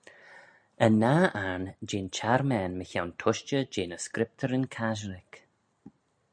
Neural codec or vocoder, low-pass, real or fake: none; 9.9 kHz; real